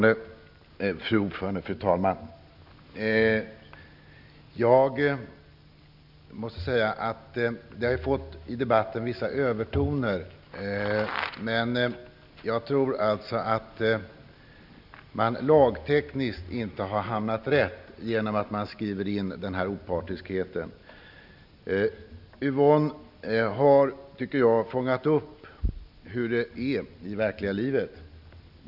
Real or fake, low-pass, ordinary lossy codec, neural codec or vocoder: real; 5.4 kHz; none; none